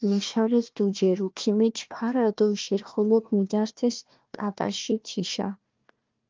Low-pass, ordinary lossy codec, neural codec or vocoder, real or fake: 7.2 kHz; Opus, 24 kbps; codec, 16 kHz, 1 kbps, FunCodec, trained on Chinese and English, 50 frames a second; fake